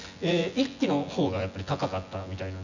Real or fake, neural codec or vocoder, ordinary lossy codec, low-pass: fake; vocoder, 24 kHz, 100 mel bands, Vocos; none; 7.2 kHz